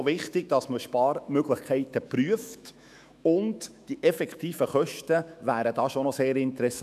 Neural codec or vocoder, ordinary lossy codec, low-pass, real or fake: autoencoder, 48 kHz, 128 numbers a frame, DAC-VAE, trained on Japanese speech; none; 14.4 kHz; fake